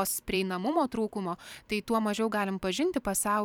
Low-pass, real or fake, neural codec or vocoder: 19.8 kHz; fake; vocoder, 44.1 kHz, 128 mel bands every 512 samples, BigVGAN v2